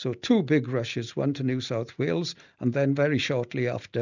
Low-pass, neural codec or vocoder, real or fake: 7.2 kHz; none; real